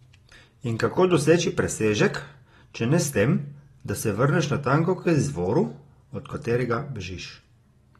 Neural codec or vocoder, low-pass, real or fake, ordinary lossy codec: none; 19.8 kHz; real; AAC, 32 kbps